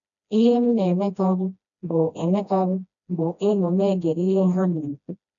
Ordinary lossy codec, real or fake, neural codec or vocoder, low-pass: none; fake; codec, 16 kHz, 1 kbps, FreqCodec, smaller model; 7.2 kHz